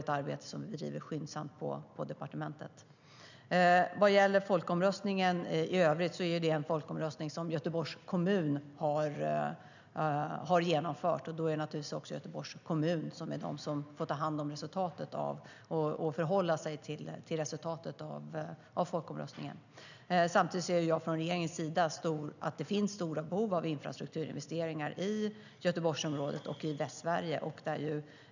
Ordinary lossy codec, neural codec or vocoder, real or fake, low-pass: none; none; real; 7.2 kHz